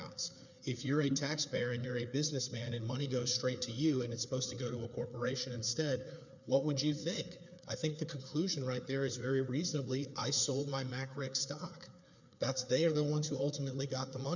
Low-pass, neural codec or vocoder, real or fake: 7.2 kHz; codec, 16 kHz, 4 kbps, FreqCodec, larger model; fake